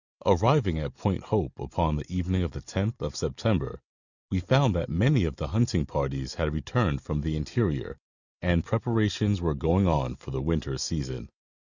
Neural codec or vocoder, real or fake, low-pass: none; real; 7.2 kHz